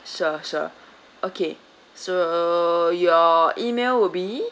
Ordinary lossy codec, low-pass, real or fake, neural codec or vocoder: none; none; real; none